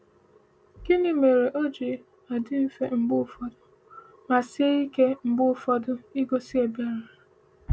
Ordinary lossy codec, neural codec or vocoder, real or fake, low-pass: none; none; real; none